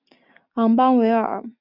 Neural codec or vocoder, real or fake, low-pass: none; real; 5.4 kHz